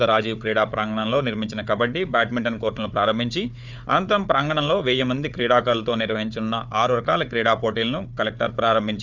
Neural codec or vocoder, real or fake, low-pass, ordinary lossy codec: codec, 16 kHz, 4 kbps, FunCodec, trained on Chinese and English, 50 frames a second; fake; 7.2 kHz; none